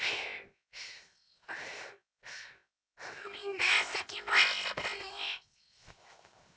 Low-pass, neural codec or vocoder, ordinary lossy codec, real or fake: none; codec, 16 kHz, 0.7 kbps, FocalCodec; none; fake